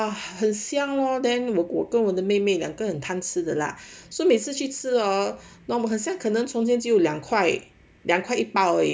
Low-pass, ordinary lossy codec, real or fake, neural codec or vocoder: none; none; real; none